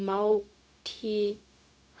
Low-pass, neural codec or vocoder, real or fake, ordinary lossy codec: none; codec, 16 kHz, 0.4 kbps, LongCat-Audio-Codec; fake; none